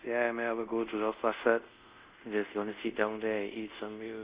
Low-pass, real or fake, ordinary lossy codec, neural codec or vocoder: 3.6 kHz; fake; Opus, 64 kbps; codec, 24 kHz, 0.5 kbps, DualCodec